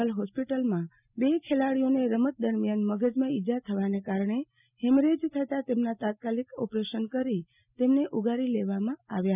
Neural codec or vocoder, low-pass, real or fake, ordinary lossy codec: none; 3.6 kHz; real; none